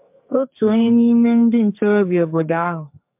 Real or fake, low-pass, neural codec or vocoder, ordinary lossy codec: fake; 3.6 kHz; codec, 44.1 kHz, 2.6 kbps, SNAC; MP3, 32 kbps